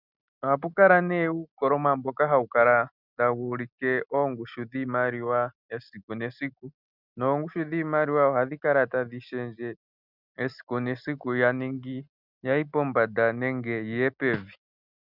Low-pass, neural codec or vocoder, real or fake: 5.4 kHz; none; real